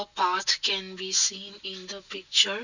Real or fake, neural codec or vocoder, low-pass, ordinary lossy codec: real; none; 7.2 kHz; none